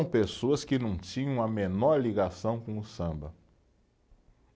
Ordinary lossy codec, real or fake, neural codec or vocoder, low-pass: none; real; none; none